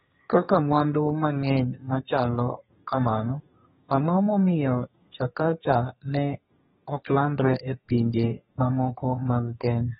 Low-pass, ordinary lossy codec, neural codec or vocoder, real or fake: 14.4 kHz; AAC, 16 kbps; codec, 32 kHz, 1.9 kbps, SNAC; fake